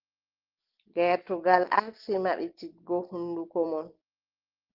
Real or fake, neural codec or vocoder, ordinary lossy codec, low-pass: real; none; Opus, 16 kbps; 5.4 kHz